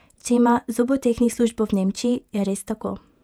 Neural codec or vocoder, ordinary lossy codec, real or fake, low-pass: vocoder, 48 kHz, 128 mel bands, Vocos; none; fake; 19.8 kHz